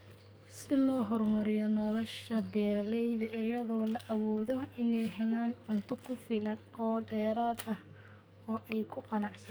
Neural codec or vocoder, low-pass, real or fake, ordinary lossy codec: codec, 44.1 kHz, 2.6 kbps, SNAC; none; fake; none